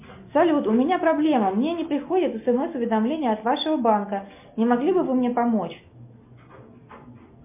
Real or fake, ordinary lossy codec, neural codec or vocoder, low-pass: real; MP3, 32 kbps; none; 3.6 kHz